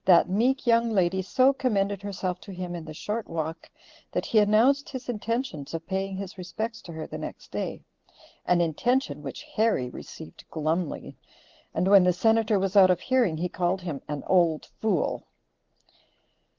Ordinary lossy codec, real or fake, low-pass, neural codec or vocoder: Opus, 16 kbps; real; 7.2 kHz; none